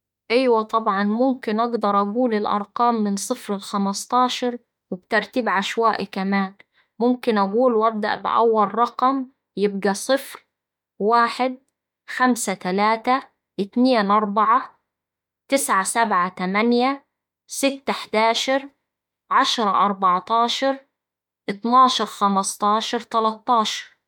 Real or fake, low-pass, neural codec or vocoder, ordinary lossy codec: fake; 19.8 kHz; autoencoder, 48 kHz, 32 numbers a frame, DAC-VAE, trained on Japanese speech; MP3, 96 kbps